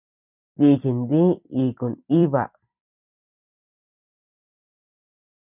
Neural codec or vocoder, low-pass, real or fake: vocoder, 44.1 kHz, 128 mel bands every 256 samples, BigVGAN v2; 3.6 kHz; fake